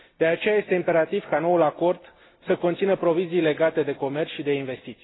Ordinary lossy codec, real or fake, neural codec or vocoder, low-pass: AAC, 16 kbps; real; none; 7.2 kHz